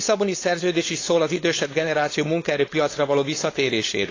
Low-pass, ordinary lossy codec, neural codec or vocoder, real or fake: 7.2 kHz; AAC, 32 kbps; codec, 16 kHz, 4.8 kbps, FACodec; fake